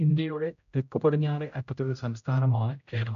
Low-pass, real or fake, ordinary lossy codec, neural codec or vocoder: 7.2 kHz; fake; AAC, 96 kbps; codec, 16 kHz, 0.5 kbps, X-Codec, HuBERT features, trained on general audio